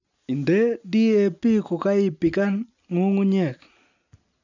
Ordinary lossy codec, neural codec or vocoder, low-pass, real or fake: none; none; 7.2 kHz; real